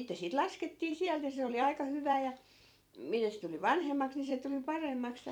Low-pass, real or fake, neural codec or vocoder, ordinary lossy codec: 19.8 kHz; fake; vocoder, 44.1 kHz, 128 mel bands every 256 samples, BigVGAN v2; none